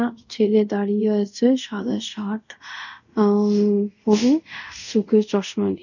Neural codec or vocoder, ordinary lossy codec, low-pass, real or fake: codec, 24 kHz, 0.5 kbps, DualCodec; none; 7.2 kHz; fake